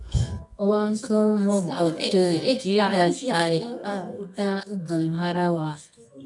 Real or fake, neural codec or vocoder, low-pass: fake; codec, 24 kHz, 0.9 kbps, WavTokenizer, medium music audio release; 10.8 kHz